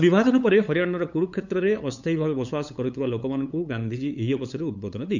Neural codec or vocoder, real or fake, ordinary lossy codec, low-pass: codec, 16 kHz, 8 kbps, FunCodec, trained on LibriTTS, 25 frames a second; fake; none; 7.2 kHz